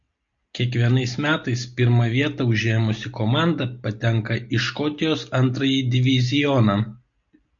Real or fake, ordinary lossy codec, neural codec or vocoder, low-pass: real; MP3, 48 kbps; none; 7.2 kHz